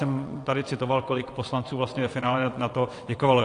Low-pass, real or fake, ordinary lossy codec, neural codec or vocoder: 9.9 kHz; fake; AAC, 48 kbps; vocoder, 22.05 kHz, 80 mel bands, WaveNeXt